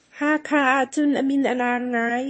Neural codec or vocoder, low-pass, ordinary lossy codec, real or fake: autoencoder, 22.05 kHz, a latent of 192 numbers a frame, VITS, trained on one speaker; 9.9 kHz; MP3, 32 kbps; fake